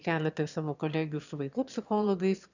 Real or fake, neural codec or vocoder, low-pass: fake; autoencoder, 22.05 kHz, a latent of 192 numbers a frame, VITS, trained on one speaker; 7.2 kHz